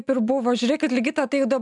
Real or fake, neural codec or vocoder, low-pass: real; none; 10.8 kHz